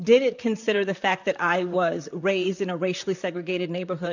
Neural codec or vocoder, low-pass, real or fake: vocoder, 44.1 kHz, 128 mel bands, Pupu-Vocoder; 7.2 kHz; fake